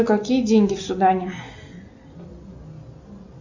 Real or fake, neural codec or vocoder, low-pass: real; none; 7.2 kHz